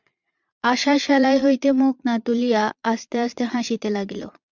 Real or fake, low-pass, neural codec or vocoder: fake; 7.2 kHz; vocoder, 22.05 kHz, 80 mel bands, Vocos